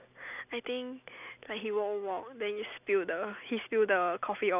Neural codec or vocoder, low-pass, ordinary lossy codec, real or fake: none; 3.6 kHz; none; real